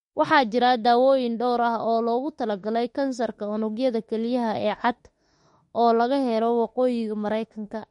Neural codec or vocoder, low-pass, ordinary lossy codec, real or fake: autoencoder, 48 kHz, 32 numbers a frame, DAC-VAE, trained on Japanese speech; 19.8 kHz; MP3, 48 kbps; fake